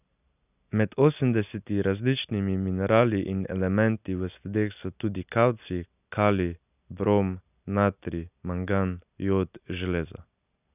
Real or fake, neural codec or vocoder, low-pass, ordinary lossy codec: real; none; 3.6 kHz; none